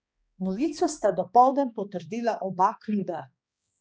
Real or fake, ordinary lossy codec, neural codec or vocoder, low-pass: fake; none; codec, 16 kHz, 2 kbps, X-Codec, HuBERT features, trained on balanced general audio; none